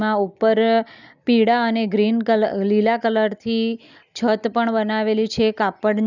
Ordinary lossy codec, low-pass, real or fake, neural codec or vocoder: none; 7.2 kHz; real; none